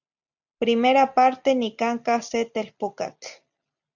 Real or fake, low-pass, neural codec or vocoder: real; 7.2 kHz; none